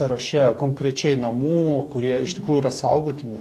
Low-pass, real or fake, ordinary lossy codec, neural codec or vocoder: 14.4 kHz; fake; AAC, 64 kbps; codec, 44.1 kHz, 2.6 kbps, DAC